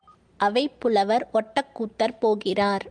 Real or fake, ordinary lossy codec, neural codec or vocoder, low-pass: real; Opus, 32 kbps; none; 9.9 kHz